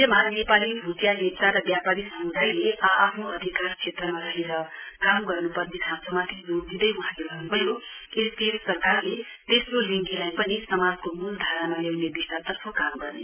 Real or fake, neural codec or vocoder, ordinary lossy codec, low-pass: real; none; none; 3.6 kHz